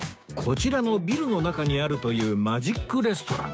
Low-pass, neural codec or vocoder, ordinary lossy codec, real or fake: none; codec, 16 kHz, 6 kbps, DAC; none; fake